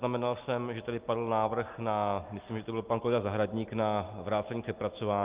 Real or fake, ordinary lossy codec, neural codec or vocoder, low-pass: real; Opus, 32 kbps; none; 3.6 kHz